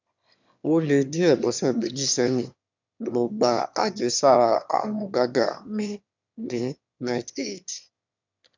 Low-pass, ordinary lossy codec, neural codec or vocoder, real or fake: 7.2 kHz; MP3, 64 kbps; autoencoder, 22.05 kHz, a latent of 192 numbers a frame, VITS, trained on one speaker; fake